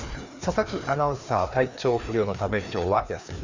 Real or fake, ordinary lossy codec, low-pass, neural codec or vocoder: fake; Opus, 64 kbps; 7.2 kHz; codec, 16 kHz, 2 kbps, FreqCodec, larger model